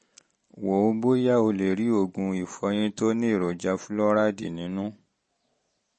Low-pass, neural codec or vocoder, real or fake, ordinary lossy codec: 10.8 kHz; none; real; MP3, 32 kbps